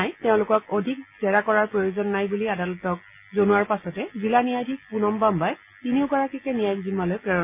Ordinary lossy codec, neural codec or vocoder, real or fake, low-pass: MP3, 24 kbps; none; real; 3.6 kHz